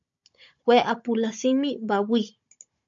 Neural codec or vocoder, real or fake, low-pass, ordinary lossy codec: codec, 16 kHz, 16 kbps, FunCodec, trained on Chinese and English, 50 frames a second; fake; 7.2 kHz; MP3, 48 kbps